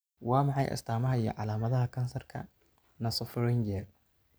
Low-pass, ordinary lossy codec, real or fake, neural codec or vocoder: none; none; real; none